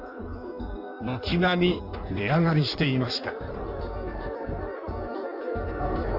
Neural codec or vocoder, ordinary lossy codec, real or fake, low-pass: codec, 16 kHz in and 24 kHz out, 1.1 kbps, FireRedTTS-2 codec; AAC, 48 kbps; fake; 5.4 kHz